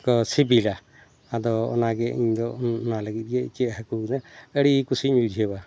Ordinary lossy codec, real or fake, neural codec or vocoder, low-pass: none; real; none; none